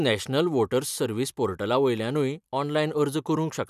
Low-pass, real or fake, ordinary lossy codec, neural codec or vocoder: 14.4 kHz; fake; none; vocoder, 44.1 kHz, 128 mel bands every 256 samples, BigVGAN v2